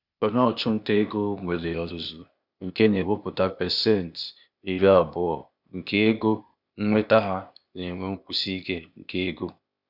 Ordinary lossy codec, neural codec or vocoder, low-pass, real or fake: none; codec, 16 kHz, 0.8 kbps, ZipCodec; 5.4 kHz; fake